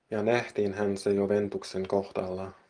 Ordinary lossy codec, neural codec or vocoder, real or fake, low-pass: Opus, 24 kbps; none; real; 9.9 kHz